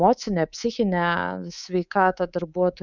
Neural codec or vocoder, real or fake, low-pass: codec, 24 kHz, 3.1 kbps, DualCodec; fake; 7.2 kHz